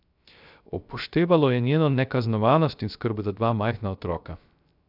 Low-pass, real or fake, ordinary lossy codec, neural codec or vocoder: 5.4 kHz; fake; none; codec, 16 kHz, 0.3 kbps, FocalCodec